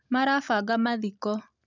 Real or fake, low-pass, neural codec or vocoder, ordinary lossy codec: real; 7.2 kHz; none; none